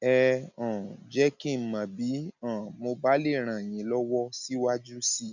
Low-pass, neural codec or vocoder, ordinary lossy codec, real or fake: 7.2 kHz; none; none; real